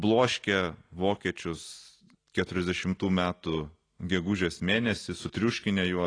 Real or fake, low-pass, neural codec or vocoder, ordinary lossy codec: real; 9.9 kHz; none; AAC, 32 kbps